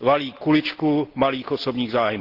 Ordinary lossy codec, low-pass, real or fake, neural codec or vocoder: Opus, 16 kbps; 5.4 kHz; real; none